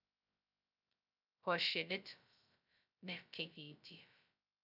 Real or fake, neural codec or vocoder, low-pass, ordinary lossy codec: fake; codec, 16 kHz, 0.2 kbps, FocalCodec; 5.4 kHz; none